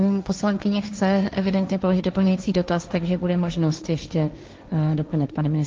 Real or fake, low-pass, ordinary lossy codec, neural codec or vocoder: fake; 7.2 kHz; Opus, 24 kbps; codec, 16 kHz, 1.1 kbps, Voila-Tokenizer